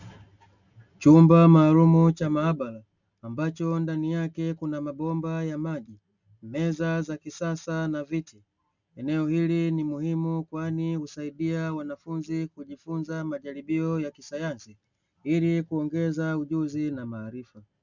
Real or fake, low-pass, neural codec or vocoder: real; 7.2 kHz; none